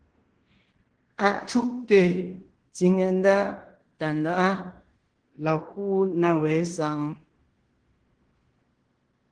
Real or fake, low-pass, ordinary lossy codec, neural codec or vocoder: fake; 9.9 kHz; Opus, 16 kbps; codec, 16 kHz in and 24 kHz out, 0.9 kbps, LongCat-Audio-Codec, fine tuned four codebook decoder